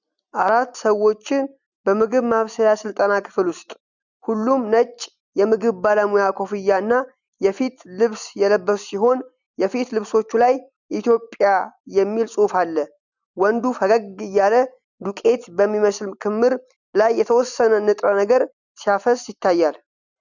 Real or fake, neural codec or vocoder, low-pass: real; none; 7.2 kHz